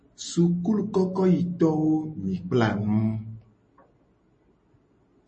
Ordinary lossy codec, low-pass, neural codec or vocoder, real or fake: MP3, 32 kbps; 10.8 kHz; none; real